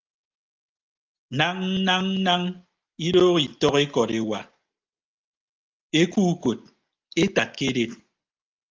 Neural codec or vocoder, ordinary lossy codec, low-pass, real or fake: none; Opus, 32 kbps; 7.2 kHz; real